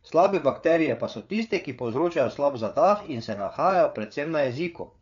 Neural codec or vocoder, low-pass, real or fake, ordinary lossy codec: codec, 16 kHz, 4 kbps, FreqCodec, larger model; 7.2 kHz; fake; none